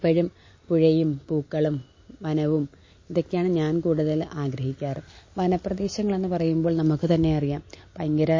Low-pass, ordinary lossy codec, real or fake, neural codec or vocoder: 7.2 kHz; MP3, 32 kbps; real; none